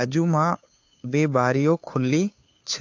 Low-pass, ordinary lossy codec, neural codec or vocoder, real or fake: 7.2 kHz; AAC, 48 kbps; codec, 16 kHz, 2 kbps, FunCodec, trained on Chinese and English, 25 frames a second; fake